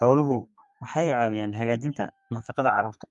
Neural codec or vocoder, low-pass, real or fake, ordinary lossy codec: codec, 32 kHz, 1.9 kbps, SNAC; 10.8 kHz; fake; MP3, 64 kbps